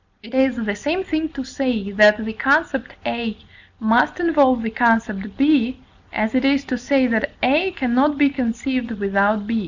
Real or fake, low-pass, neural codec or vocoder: real; 7.2 kHz; none